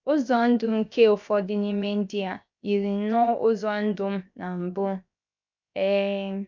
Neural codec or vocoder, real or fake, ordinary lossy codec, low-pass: codec, 16 kHz, 0.7 kbps, FocalCodec; fake; MP3, 64 kbps; 7.2 kHz